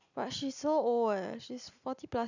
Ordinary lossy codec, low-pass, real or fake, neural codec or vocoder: none; 7.2 kHz; real; none